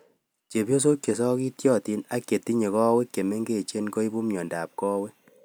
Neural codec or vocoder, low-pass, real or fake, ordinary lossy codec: none; none; real; none